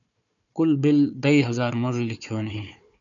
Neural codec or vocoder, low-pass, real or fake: codec, 16 kHz, 4 kbps, FunCodec, trained on Chinese and English, 50 frames a second; 7.2 kHz; fake